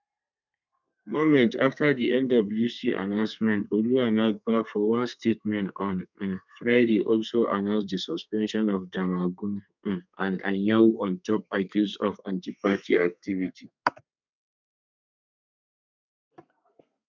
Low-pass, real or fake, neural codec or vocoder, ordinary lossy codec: 7.2 kHz; fake; codec, 32 kHz, 1.9 kbps, SNAC; none